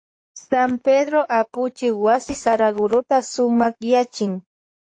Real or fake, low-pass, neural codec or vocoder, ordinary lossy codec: fake; 9.9 kHz; codec, 16 kHz in and 24 kHz out, 2.2 kbps, FireRedTTS-2 codec; AAC, 48 kbps